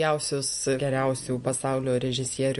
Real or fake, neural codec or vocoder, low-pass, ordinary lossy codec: real; none; 14.4 kHz; MP3, 48 kbps